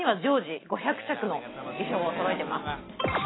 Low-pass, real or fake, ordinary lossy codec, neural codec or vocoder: 7.2 kHz; real; AAC, 16 kbps; none